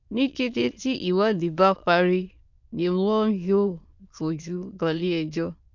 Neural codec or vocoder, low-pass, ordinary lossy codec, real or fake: autoencoder, 22.05 kHz, a latent of 192 numbers a frame, VITS, trained on many speakers; 7.2 kHz; none; fake